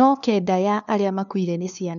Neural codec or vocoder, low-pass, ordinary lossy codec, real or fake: codec, 16 kHz, 2 kbps, FunCodec, trained on LibriTTS, 25 frames a second; 7.2 kHz; none; fake